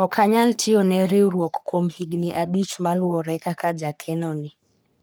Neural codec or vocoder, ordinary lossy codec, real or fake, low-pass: codec, 44.1 kHz, 3.4 kbps, Pupu-Codec; none; fake; none